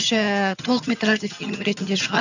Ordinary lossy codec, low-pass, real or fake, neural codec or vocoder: none; 7.2 kHz; fake; vocoder, 22.05 kHz, 80 mel bands, HiFi-GAN